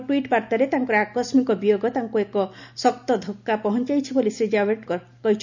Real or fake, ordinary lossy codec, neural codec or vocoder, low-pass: real; none; none; 7.2 kHz